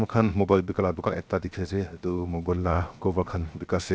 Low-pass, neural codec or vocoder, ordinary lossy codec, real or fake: none; codec, 16 kHz, 0.7 kbps, FocalCodec; none; fake